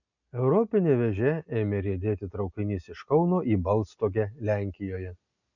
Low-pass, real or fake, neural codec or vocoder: 7.2 kHz; real; none